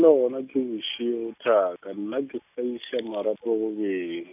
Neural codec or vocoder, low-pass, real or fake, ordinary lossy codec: none; 3.6 kHz; real; none